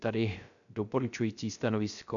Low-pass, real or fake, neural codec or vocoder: 7.2 kHz; fake; codec, 16 kHz, 0.3 kbps, FocalCodec